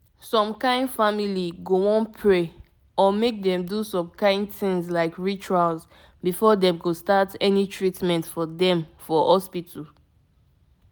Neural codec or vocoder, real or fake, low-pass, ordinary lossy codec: none; real; none; none